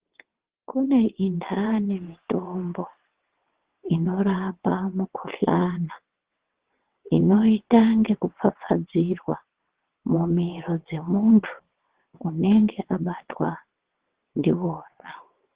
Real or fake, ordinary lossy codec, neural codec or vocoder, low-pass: fake; Opus, 16 kbps; vocoder, 22.05 kHz, 80 mel bands, WaveNeXt; 3.6 kHz